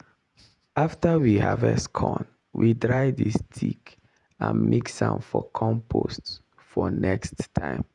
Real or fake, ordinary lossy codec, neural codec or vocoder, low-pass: fake; none; vocoder, 48 kHz, 128 mel bands, Vocos; 10.8 kHz